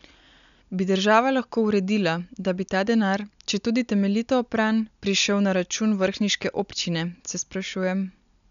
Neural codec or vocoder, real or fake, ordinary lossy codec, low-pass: none; real; none; 7.2 kHz